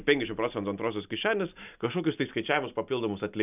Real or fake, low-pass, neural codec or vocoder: real; 3.6 kHz; none